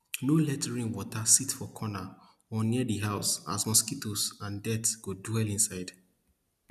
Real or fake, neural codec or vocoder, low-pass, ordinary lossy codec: real; none; 14.4 kHz; none